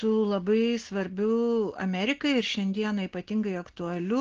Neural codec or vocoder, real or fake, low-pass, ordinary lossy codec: none; real; 7.2 kHz; Opus, 16 kbps